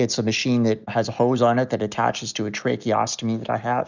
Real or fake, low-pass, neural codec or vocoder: real; 7.2 kHz; none